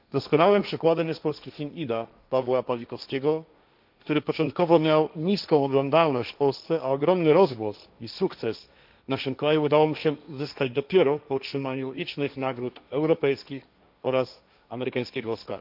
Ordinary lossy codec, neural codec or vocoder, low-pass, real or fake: none; codec, 16 kHz, 1.1 kbps, Voila-Tokenizer; 5.4 kHz; fake